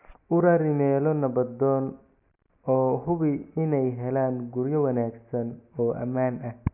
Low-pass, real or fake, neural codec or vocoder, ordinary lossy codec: 3.6 kHz; real; none; none